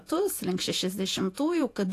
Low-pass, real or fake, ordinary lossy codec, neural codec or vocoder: 14.4 kHz; fake; AAC, 64 kbps; vocoder, 48 kHz, 128 mel bands, Vocos